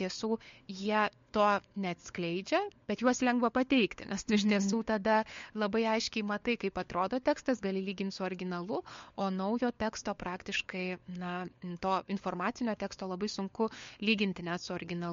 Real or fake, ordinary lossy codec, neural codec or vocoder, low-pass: fake; MP3, 48 kbps; codec, 16 kHz, 4 kbps, FunCodec, trained on LibriTTS, 50 frames a second; 7.2 kHz